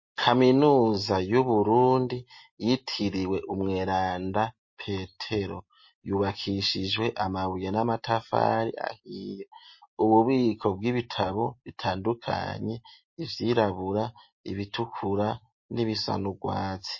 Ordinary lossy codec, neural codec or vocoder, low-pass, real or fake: MP3, 32 kbps; none; 7.2 kHz; real